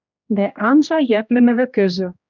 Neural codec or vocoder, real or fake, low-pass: codec, 16 kHz, 1 kbps, X-Codec, HuBERT features, trained on general audio; fake; 7.2 kHz